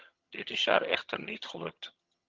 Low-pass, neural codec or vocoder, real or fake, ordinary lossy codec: 7.2 kHz; vocoder, 22.05 kHz, 80 mel bands, HiFi-GAN; fake; Opus, 24 kbps